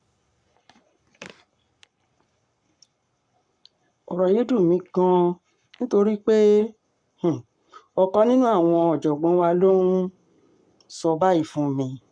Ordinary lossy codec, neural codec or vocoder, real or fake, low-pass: none; codec, 44.1 kHz, 7.8 kbps, Pupu-Codec; fake; 9.9 kHz